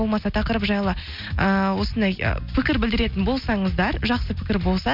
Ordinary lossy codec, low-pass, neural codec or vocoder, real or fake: MP3, 48 kbps; 5.4 kHz; none; real